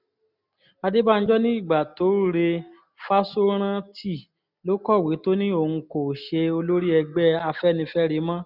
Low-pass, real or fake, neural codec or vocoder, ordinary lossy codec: 5.4 kHz; real; none; none